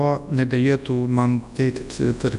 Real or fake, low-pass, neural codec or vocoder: fake; 10.8 kHz; codec, 24 kHz, 0.9 kbps, WavTokenizer, large speech release